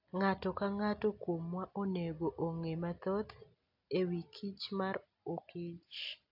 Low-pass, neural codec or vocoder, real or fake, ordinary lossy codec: 5.4 kHz; none; real; AAC, 48 kbps